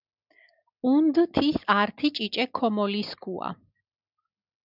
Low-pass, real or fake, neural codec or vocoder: 5.4 kHz; real; none